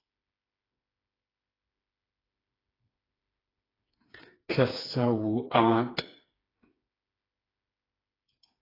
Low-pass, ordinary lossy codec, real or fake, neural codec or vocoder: 5.4 kHz; AAC, 24 kbps; fake; codec, 16 kHz, 4 kbps, FreqCodec, smaller model